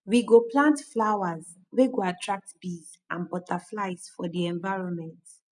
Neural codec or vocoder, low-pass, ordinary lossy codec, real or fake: none; 10.8 kHz; none; real